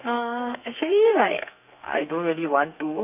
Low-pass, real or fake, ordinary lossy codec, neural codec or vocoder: 3.6 kHz; fake; none; codec, 32 kHz, 1.9 kbps, SNAC